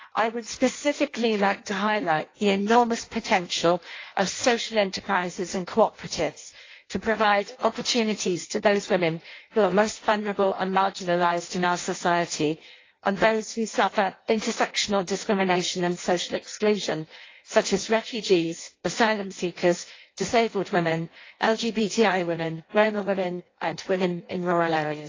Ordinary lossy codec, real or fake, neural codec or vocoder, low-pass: AAC, 32 kbps; fake; codec, 16 kHz in and 24 kHz out, 0.6 kbps, FireRedTTS-2 codec; 7.2 kHz